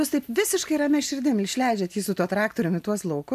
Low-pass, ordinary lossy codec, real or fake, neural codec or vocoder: 14.4 kHz; MP3, 96 kbps; real; none